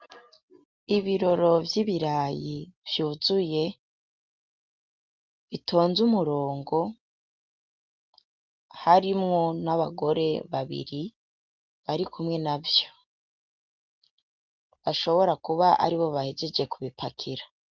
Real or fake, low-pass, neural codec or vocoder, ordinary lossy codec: real; 7.2 kHz; none; Opus, 32 kbps